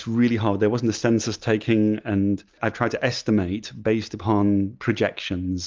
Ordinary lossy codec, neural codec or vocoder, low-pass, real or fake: Opus, 24 kbps; none; 7.2 kHz; real